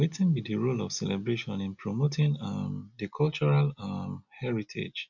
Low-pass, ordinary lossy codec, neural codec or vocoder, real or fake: 7.2 kHz; none; none; real